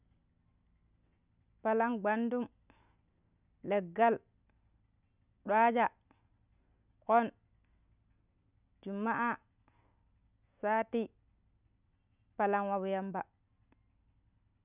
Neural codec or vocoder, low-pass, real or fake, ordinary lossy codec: none; 3.6 kHz; real; none